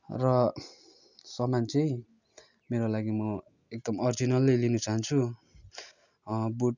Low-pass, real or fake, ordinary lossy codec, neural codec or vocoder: 7.2 kHz; real; none; none